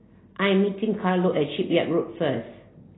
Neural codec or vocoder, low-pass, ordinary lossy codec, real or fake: none; 7.2 kHz; AAC, 16 kbps; real